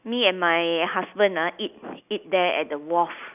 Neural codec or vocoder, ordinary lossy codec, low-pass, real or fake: none; none; 3.6 kHz; real